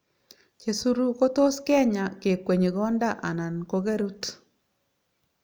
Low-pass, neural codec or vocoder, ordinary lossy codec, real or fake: none; none; none; real